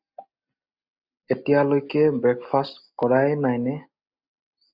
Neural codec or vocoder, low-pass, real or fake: none; 5.4 kHz; real